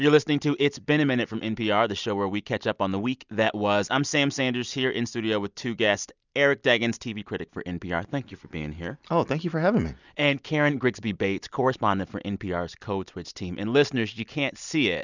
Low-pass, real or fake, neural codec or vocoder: 7.2 kHz; real; none